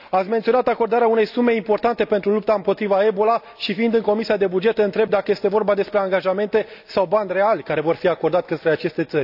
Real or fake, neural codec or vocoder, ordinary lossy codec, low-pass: real; none; AAC, 48 kbps; 5.4 kHz